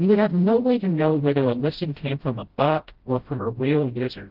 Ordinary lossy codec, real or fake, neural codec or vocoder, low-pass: Opus, 16 kbps; fake; codec, 16 kHz, 0.5 kbps, FreqCodec, smaller model; 5.4 kHz